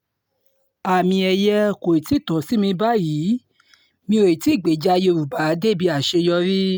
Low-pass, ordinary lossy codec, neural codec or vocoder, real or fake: none; none; none; real